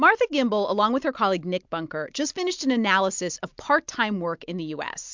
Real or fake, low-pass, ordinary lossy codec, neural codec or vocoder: real; 7.2 kHz; MP3, 64 kbps; none